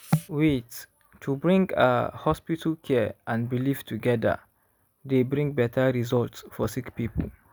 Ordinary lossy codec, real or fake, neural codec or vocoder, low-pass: none; real; none; none